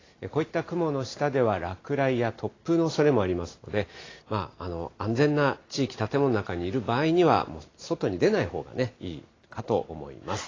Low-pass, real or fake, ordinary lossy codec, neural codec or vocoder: 7.2 kHz; real; AAC, 32 kbps; none